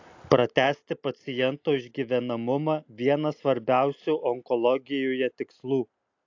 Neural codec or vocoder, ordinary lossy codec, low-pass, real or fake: none; AAC, 48 kbps; 7.2 kHz; real